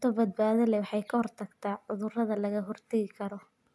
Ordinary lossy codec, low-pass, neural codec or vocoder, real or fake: none; none; none; real